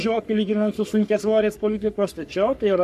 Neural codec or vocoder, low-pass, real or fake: codec, 44.1 kHz, 3.4 kbps, Pupu-Codec; 14.4 kHz; fake